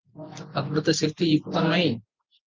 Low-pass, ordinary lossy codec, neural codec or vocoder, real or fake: 7.2 kHz; Opus, 32 kbps; none; real